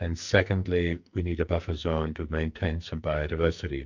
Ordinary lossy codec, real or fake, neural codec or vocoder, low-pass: MP3, 64 kbps; fake; codec, 44.1 kHz, 2.6 kbps, SNAC; 7.2 kHz